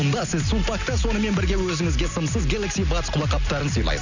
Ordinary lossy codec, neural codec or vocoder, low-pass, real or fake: none; none; 7.2 kHz; real